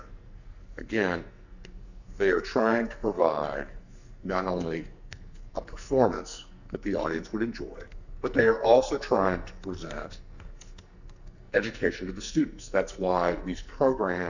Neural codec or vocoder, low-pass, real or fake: codec, 44.1 kHz, 2.6 kbps, SNAC; 7.2 kHz; fake